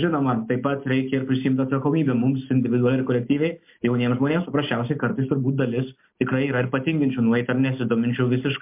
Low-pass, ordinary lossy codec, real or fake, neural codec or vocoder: 3.6 kHz; MP3, 32 kbps; real; none